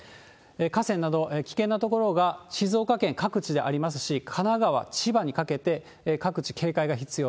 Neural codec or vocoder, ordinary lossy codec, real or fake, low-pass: none; none; real; none